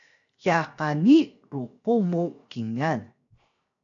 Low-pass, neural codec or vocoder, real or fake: 7.2 kHz; codec, 16 kHz, 0.7 kbps, FocalCodec; fake